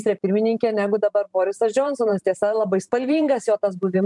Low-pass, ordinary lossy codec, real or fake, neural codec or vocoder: 10.8 kHz; MP3, 96 kbps; fake; vocoder, 44.1 kHz, 128 mel bands every 512 samples, BigVGAN v2